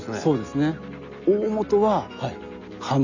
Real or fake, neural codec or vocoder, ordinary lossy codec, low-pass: real; none; none; 7.2 kHz